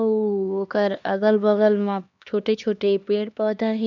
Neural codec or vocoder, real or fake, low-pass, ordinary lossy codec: codec, 16 kHz, 2 kbps, X-Codec, HuBERT features, trained on LibriSpeech; fake; 7.2 kHz; none